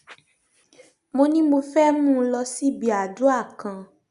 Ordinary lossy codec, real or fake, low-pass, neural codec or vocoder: Opus, 64 kbps; real; 10.8 kHz; none